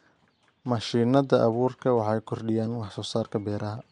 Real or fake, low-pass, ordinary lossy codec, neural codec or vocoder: real; 9.9 kHz; MP3, 64 kbps; none